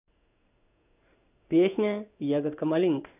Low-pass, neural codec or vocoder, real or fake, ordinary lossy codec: 3.6 kHz; codec, 16 kHz in and 24 kHz out, 1 kbps, XY-Tokenizer; fake; none